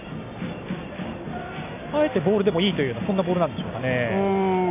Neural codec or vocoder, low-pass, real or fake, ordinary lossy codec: none; 3.6 kHz; real; none